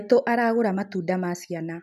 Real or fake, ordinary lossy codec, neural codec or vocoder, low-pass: real; none; none; 14.4 kHz